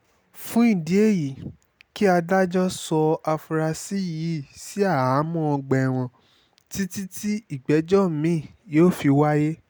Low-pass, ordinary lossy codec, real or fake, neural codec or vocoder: none; none; real; none